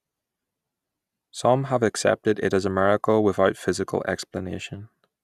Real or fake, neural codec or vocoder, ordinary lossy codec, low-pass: real; none; none; 14.4 kHz